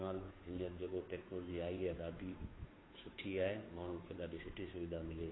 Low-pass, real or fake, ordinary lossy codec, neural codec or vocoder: 7.2 kHz; fake; AAC, 16 kbps; codec, 16 kHz, 2 kbps, FunCodec, trained on Chinese and English, 25 frames a second